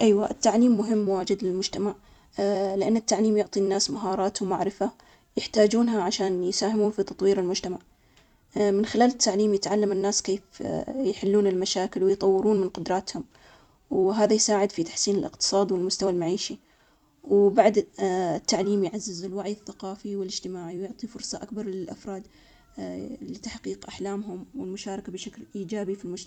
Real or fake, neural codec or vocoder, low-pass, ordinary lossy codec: fake; vocoder, 44.1 kHz, 128 mel bands every 256 samples, BigVGAN v2; 19.8 kHz; none